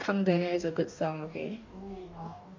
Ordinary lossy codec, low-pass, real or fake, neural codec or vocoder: MP3, 64 kbps; 7.2 kHz; fake; codec, 44.1 kHz, 2.6 kbps, DAC